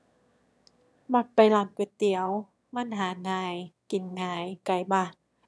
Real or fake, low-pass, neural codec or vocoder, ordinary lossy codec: fake; none; autoencoder, 22.05 kHz, a latent of 192 numbers a frame, VITS, trained on one speaker; none